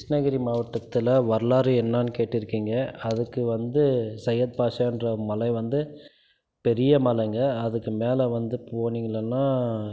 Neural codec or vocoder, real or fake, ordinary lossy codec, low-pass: none; real; none; none